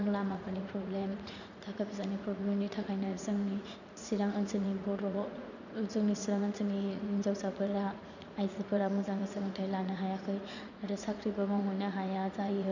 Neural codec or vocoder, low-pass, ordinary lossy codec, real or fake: vocoder, 44.1 kHz, 80 mel bands, Vocos; 7.2 kHz; none; fake